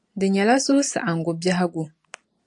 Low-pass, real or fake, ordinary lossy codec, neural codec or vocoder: 10.8 kHz; real; AAC, 64 kbps; none